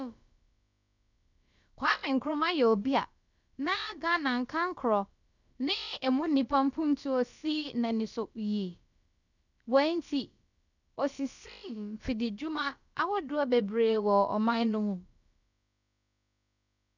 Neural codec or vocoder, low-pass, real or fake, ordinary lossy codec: codec, 16 kHz, about 1 kbps, DyCAST, with the encoder's durations; 7.2 kHz; fake; none